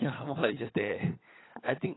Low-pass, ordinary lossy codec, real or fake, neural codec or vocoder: 7.2 kHz; AAC, 16 kbps; fake; codec, 16 kHz, 16 kbps, FunCodec, trained on LibriTTS, 50 frames a second